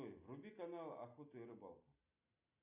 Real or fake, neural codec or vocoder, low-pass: real; none; 3.6 kHz